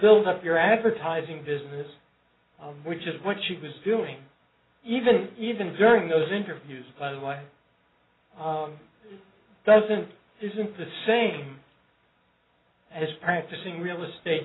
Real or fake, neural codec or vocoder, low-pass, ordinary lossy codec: real; none; 7.2 kHz; AAC, 16 kbps